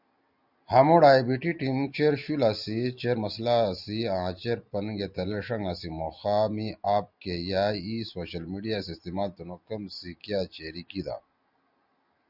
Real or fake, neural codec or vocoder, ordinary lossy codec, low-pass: real; none; Opus, 64 kbps; 5.4 kHz